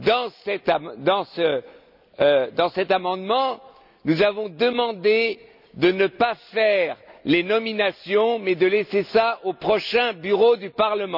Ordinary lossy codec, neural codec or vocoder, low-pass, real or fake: none; none; 5.4 kHz; real